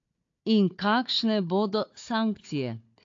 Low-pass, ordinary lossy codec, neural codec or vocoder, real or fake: 7.2 kHz; AAC, 48 kbps; codec, 16 kHz, 4 kbps, FunCodec, trained on Chinese and English, 50 frames a second; fake